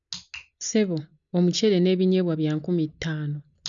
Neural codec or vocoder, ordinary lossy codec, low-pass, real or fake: none; none; 7.2 kHz; real